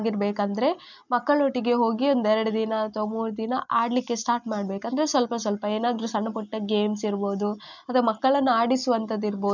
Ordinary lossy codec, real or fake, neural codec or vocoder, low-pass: none; real; none; 7.2 kHz